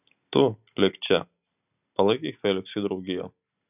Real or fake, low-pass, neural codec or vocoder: real; 3.6 kHz; none